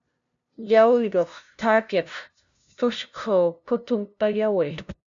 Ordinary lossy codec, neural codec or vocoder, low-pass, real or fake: MP3, 64 kbps; codec, 16 kHz, 0.5 kbps, FunCodec, trained on LibriTTS, 25 frames a second; 7.2 kHz; fake